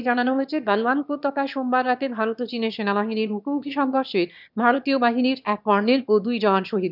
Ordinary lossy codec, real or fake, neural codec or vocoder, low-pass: none; fake; autoencoder, 22.05 kHz, a latent of 192 numbers a frame, VITS, trained on one speaker; 5.4 kHz